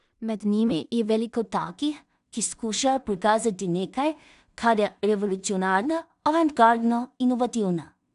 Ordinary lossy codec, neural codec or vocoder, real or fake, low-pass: none; codec, 16 kHz in and 24 kHz out, 0.4 kbps, LongCat-Audio-Codec, two codebook decoder; fake; 10.8 kHz